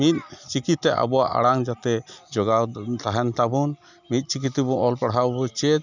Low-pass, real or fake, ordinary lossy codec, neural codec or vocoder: 7.2 kHz; real; none; none